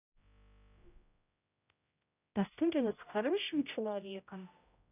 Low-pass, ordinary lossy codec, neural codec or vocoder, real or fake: 3.6 kHz; none; codec, 16 kHz, 0.5 kbps, X-Codec, HuBERT features, trained on general audio; fake